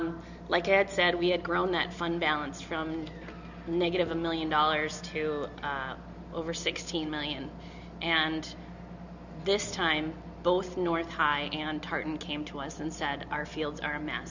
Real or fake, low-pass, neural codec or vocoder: real; 7.2 kHz; none